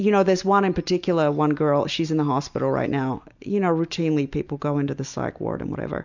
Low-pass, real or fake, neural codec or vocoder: 7.2 kHz; real; none